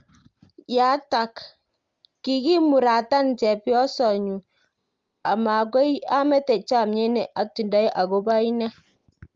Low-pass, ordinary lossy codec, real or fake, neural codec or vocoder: 7.2 kHz; Opus, 32 kbps; real; none